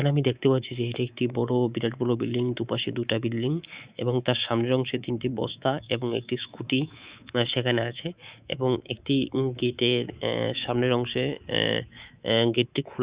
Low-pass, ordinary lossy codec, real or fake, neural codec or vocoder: 3.6 kHz; Opus, 64 kbps; fake; codec, 24 kHz, 3.1 kbps, DualCodec